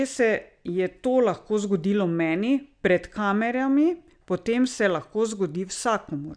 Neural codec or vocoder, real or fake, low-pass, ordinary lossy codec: none; real; 9.9 kHz; none